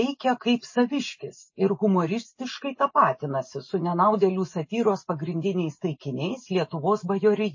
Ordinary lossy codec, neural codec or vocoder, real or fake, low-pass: MP3, 32 kbps; none; real; 7.2 kHz